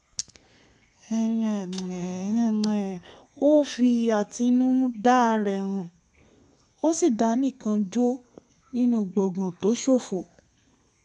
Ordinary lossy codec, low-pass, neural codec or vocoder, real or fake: none; 10.8 kHz; codec, 32 kHz, 1.9 kbps, SNAC; fake